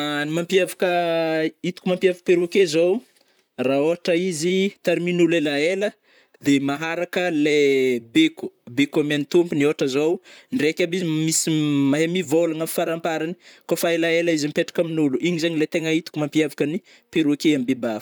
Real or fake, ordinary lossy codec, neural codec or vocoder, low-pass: fake; none; vocoder, 44.1 kHz, 128 mel bands, Pupu-Vocoder; none